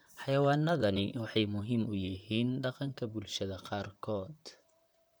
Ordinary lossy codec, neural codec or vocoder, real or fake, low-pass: none; vocoder, 44.1 kHz, 128 mel bands, Pupu-Vocoder; fake; none